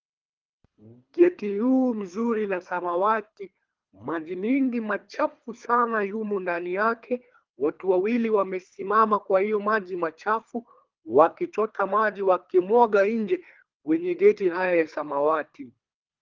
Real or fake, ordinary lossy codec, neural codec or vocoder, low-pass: fake; Opus, 32 kbps; codec, 24 kHz, 3 kbps, HILCodec; 7.2 kHz